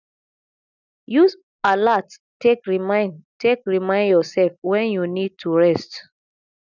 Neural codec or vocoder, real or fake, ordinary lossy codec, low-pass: none; real; none; 7.2 kHz